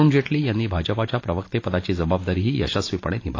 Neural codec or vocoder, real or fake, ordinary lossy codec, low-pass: none; real; AAC, 32 kbps; 7.2 kHz